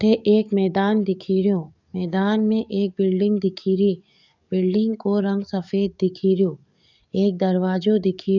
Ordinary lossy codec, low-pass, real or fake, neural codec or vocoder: none; 7.2 kHz; fake; codec, 44.1 kHz, 7.8 kbps, DAC